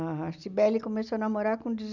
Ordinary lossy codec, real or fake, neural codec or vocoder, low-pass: none; real; none; 7.2 kHz